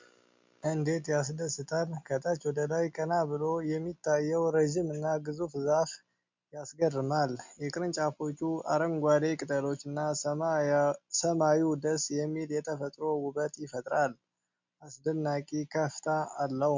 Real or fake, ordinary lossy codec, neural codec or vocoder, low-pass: real; MP3, 64 kbps; none; 7.2 kHz